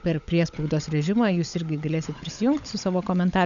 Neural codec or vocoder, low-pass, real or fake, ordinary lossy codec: codec, 16 kHz, 16 kbps, FunCodec, trained on LibriTTS, 50 frames a second; 7.2 kHz; fake; MP3, 96 kbps